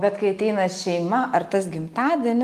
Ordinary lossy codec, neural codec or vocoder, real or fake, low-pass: Opus, 32 kbps; none; real; 14.4 kHz